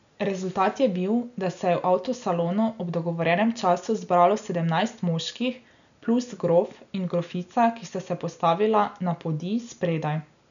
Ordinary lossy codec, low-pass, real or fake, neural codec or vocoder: none; 7.2 kHz; real; none